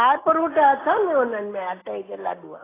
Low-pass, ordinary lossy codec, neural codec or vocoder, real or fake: 3.6 kHz; AAC, 16 kbps; none; real